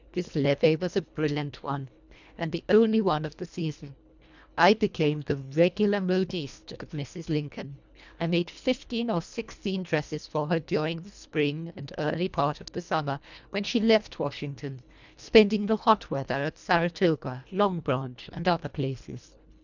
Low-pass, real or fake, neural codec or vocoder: 7.2 kHz; fake; codec, 24 kHz, 1.5 kbps, HILCodec